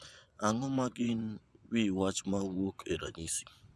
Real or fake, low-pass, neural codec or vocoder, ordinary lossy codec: fake; none; vocoder, 24 kHz, 100 mel bands, Vocos; none